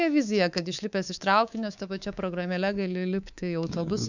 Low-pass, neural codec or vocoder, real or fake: 7.2 kHz; codec, 24 kHz, 3.1 kbps, DualCodec; fake